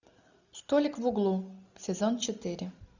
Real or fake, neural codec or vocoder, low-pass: real; none; 7.2 kHz